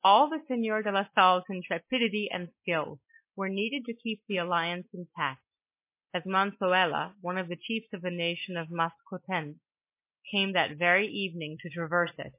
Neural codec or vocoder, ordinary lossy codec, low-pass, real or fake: none; MP3, 24 kbps; 3.6 kHz; real